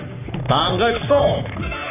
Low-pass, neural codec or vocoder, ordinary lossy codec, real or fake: 3.6 kHz; codec, 44.1 kHz, 3.4 kbps, Pupu-Codec; none; fake